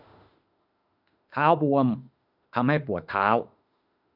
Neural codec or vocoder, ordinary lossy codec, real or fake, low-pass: autoencoder, 48 kHz, 32 numbers a frame, DAC-VAE, trained on Japanese speech; none; fake; 5.4 kHz